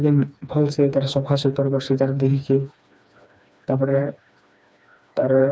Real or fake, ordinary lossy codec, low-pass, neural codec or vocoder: fake; none; none; codec, 16 kHz, 2 kbps, FreqCodec, smaller model